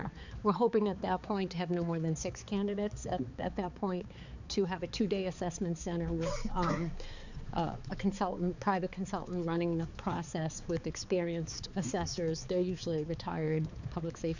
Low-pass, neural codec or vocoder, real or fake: 7.2 kHz; codec, 16 kHz, 4 kbps, X-Codec, HuBERT features, trained on balanced general audio; fake